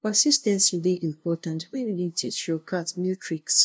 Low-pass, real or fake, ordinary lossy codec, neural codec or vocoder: none; fake; none; codec, 16 kHz, 0.5 kbps, FunCodec, trained on LibriTTS, 25 frames a second